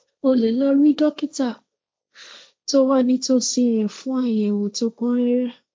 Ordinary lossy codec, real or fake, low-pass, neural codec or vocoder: none; fake; none; codec, 16 kHz, 1.1 kbps, Voila-Tokenizer